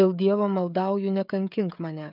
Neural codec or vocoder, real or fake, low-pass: codec, 16 kHz, 16 kbps, FreqCodec, smaller model; fake; 5.4 kHz